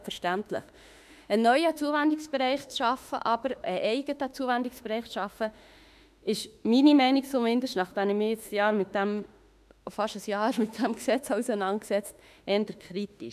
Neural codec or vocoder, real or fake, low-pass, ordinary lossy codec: autoencoder, 48 kHz, 32 numbers a frame, DAC-VAE, trained on Japanese speech; fake; 14.4 kHz; none